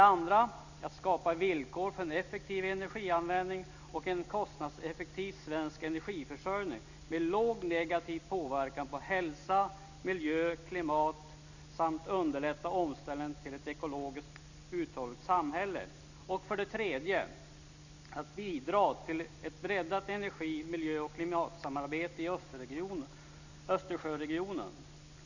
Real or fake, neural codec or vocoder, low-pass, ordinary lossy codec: real; none; 7.2 kHz; none